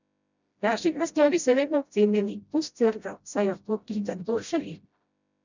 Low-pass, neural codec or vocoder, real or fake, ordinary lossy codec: 7.2 kHz; codec, 16 kHz, 0.5 kbps, FreqCodec, smaller model; fake; none